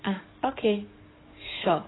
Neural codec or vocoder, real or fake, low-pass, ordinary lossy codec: codec, 44.1 kHz, 7.8 kbps, DAC; fake; 7.2 kHz; AAC, 16 kbps